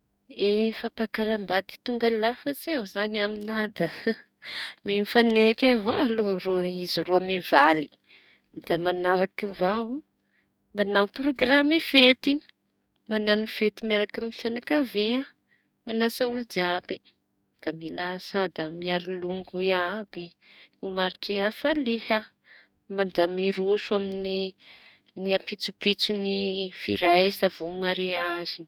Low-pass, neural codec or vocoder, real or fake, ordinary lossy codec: 19.8 kHz; codec, 44.1 kHz, 2.6 kbps, DAC; fake; none